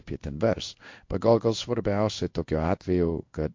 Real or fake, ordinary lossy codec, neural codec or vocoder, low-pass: fake; MP3, 48 kbps; codec, 16 kHz in and 24 kHz out, 1 kbps, XY-Tokenizer; 7.2 kHz